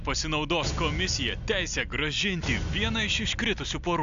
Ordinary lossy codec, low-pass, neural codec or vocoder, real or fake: AAC, 64 kbps; 7.2 kHz; none; real